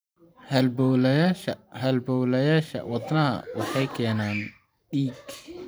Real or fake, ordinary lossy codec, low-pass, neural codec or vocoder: fake; none; none; vocoder, 44.1 kHz, 128 mel bands every 512 samples, BigVGAN v2